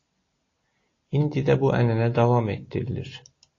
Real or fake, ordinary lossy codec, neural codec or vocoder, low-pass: real; AAC, 32 kbps; none; 7.2 kHz